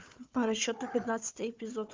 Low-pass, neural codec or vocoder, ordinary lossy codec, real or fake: 7.2 kHz; codec, 16 kHz, 4 kbps, FunCodec, trained on Chinese and English, 50 frames a second; Opus, 32 kbps; fake